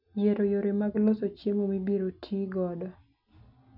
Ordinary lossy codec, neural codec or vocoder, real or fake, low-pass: none; none; real; 5.4 kHz